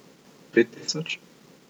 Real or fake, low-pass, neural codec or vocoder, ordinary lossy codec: real; none; none; none